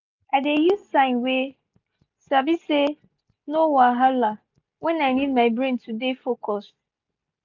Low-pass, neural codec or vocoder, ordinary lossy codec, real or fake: 7.2 kHz; none; none; real